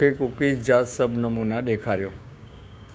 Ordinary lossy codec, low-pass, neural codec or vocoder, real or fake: none; none; codec, 16 kHz, 6 kbps, DAC; fake